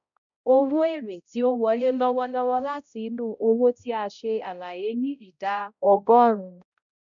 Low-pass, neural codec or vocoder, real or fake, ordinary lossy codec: 7.2 kHz; codec, 16 kHz, 0.5 kbps, X-Codec, HuBERT features, trained on balanced general audio; fake; MP3, 96 kbps